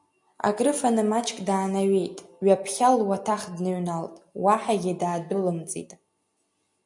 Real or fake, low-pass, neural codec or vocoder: real; 10.8 kHz; none